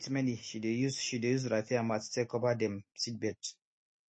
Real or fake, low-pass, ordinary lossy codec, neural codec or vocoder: real; 9.9 kHz; MP3, 32 kbps; none